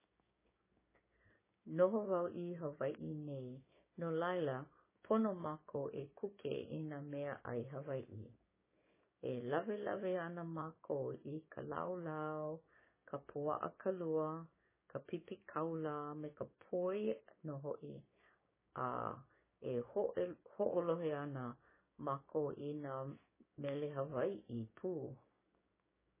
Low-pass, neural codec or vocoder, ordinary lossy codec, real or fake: 3.6 kHz; codec, 16 kHz, 6 kbps, DAC; MP3, 16 kbps; fake